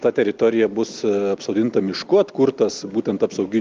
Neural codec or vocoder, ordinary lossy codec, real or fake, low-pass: none; Opus, 24 kbps; real; 7.2 kHz